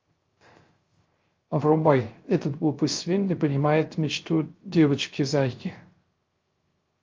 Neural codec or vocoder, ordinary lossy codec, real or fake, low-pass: codec, 16 kHz, 0.3 kbps, FocalCodec; Opus, 32 kbps; fake; 7.2 kHz